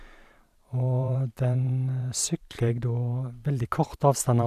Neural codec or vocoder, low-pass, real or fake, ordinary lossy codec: vocoder, 44.1 kHz, 128 mel bands every 512 samples, BigVGAN v2; 14.4 kHz; fake; none